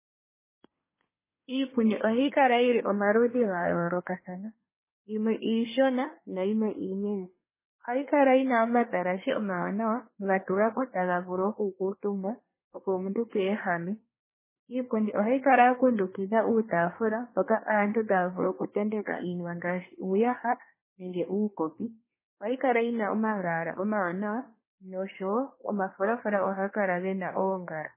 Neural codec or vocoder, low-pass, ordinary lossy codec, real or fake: codec, 24 kHz, 1 kbps, SNAC; 3.6 kHz; MP3, 16 kbps; fake